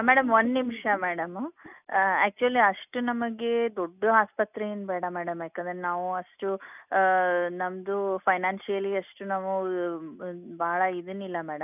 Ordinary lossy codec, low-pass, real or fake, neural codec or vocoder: none; 3.6 kHz; real; none